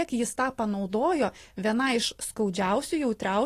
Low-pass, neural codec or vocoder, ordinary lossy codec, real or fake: 14.4 kHz; none; AAC, 48 kbps; real